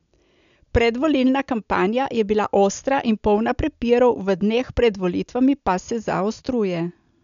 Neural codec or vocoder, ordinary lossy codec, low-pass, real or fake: none; none; 7.2 kHz; real